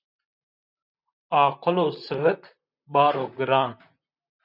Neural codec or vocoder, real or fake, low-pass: vocoder, 44.1 kHz, 128 mel bands, Pupu-Vocoder; fake; 5.4 kHz